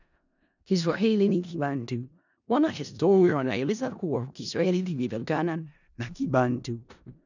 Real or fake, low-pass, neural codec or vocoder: fake; 7.2 kHz; codec, 16 kHz in and 24 kHz out, 0.4 kbps, LongCat-Audio-Codec, four codebook decoder